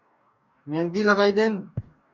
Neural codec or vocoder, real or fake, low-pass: codec, 44.1 kHz, 2.6 kbps, DAC; fake; 7.2 kHz